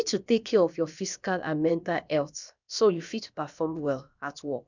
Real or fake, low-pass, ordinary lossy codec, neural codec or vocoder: fake; 7.2 kHz; none; codec, 16 kHz, about 1 kbps, DyCAST, with the encoder's durations